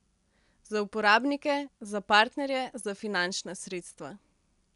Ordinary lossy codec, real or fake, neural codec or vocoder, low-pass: none; real; none; 10.8 kHz